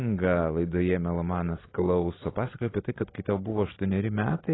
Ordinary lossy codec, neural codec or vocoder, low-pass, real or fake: AAC, 16 kbps; none; 7.2 kHz; real